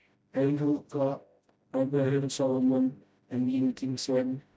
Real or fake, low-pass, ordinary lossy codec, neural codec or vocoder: fake; none; none; codec, 16 kHz, 0.5 kbps, FreqCodec, smaller model